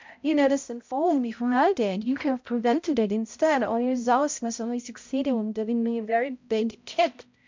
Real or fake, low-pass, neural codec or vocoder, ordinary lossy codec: fake; 7.2 kHz; codec, 16 kHz, 0.5 kbps, X-Codec, HuBERT features, trained on balanced general audio; MP3, 64 kbps